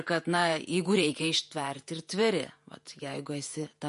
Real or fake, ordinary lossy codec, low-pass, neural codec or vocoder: fake; MP3, 48 kbps; 14.4 kHz; vocoder, 48 kHz, 128 mel bands, Vocos